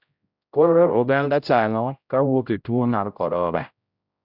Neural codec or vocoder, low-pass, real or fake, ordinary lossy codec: codec, 16 kHz, 0.5 kbps, X-Codec, HuBERT features, trained on general audio; 5.4 kHz; fake; none